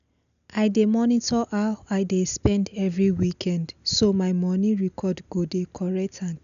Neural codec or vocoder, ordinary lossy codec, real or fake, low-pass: none; none; real; 7.2 kHz